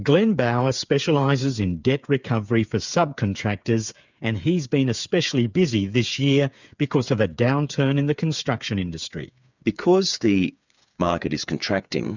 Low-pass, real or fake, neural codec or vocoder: 7.2 kHz; fake; codec, 16 kHz, 8 kbps, FreqCodec, smaller model